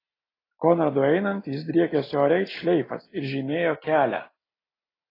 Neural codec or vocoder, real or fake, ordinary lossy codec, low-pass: none; real; AAC, 24 kbps; 5.4 kHz